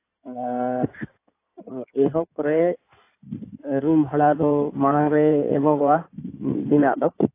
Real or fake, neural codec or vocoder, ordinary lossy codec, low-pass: fake; codec, 16 kHz in and 24 kHz out, 2.2 kbps, FireRedTTS-2 codec; AAC, 24 kbps; 3.6 kHz